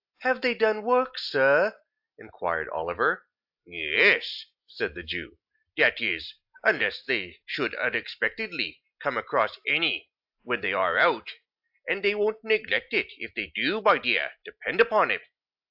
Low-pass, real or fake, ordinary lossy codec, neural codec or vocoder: 5.4 kHz; real; AAC, 48 kbps; none